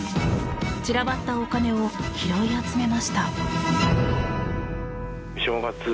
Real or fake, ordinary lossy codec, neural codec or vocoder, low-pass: real; none; none; none